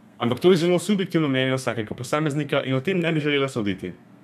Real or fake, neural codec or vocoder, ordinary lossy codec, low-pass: fake; codec, 32 kHz, 1.9 kbps, SNAC; none; 14.4 kHz